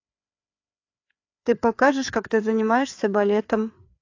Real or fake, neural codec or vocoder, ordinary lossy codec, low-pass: fake; codec, 16 kHz, 4 kbps, FreqCodec, larger model; AAC, 48 kbps; 7.2 kHz